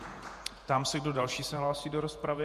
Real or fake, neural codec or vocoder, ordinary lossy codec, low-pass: fake; vocoder, 48 kHz, 128 mel bands, Vocos; MP3, 64 kbps; 14.4 kHz